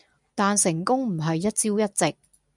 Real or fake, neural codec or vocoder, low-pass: real; none; 10.8 kHz